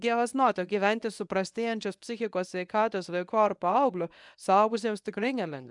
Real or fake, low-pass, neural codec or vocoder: fake; 10.8 kHz; codec, 24 kHz, 0.9 kbps, WavTokenizer, medium speech release version 1